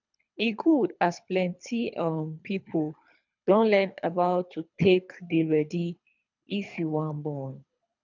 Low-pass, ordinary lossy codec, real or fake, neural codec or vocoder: 7.2 kHz; none; fake; codec, 24 kHz, 3 kbps, HILCodec